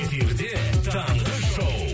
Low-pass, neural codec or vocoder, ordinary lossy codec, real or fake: none; none; none; real